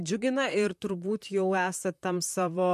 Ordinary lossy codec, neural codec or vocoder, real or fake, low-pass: MP3, 64 kbps; vocoder, 44.1 kHz, 128 mel bands, Pupu-Vocoder; fake; 14.4 kHz